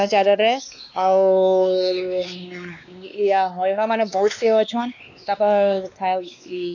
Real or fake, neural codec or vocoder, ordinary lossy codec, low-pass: fake; codec, 16 kHz, 2 kbps, X-Codec, WavLM features, trained on Multilingual LibriSpeech; none; 7.2 kHz